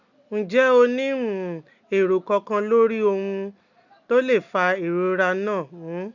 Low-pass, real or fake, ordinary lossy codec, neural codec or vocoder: 7.2 kHz; real; none; none